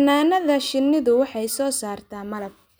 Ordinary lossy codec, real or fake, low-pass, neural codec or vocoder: none; real; none; none